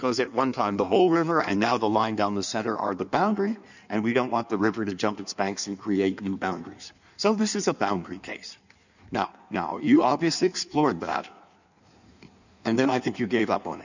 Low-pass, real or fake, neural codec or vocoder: 7.2 kHz; fake; codec, 16 kHz in and 24 kHz out, 1.1 kbps, FireRedTTS-2 codec